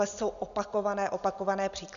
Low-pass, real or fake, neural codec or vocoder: 7.2 kHz; real; none